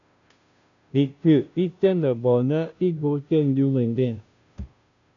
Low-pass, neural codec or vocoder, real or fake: 7.2 kHz; codec, 16 kHz, 0.5 kbps, FunCodec, trained on Chinese and English, 25 frames a second; fake